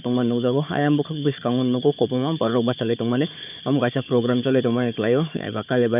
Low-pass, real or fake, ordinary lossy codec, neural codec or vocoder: 3.6 kHz; fake; none; codec, 16 kHz, 4 kbps, FunCodec, trained on Chinese and English, 50 frames a second